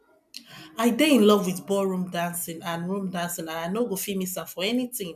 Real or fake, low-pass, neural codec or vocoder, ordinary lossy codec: real; 14.4 kHz; none; none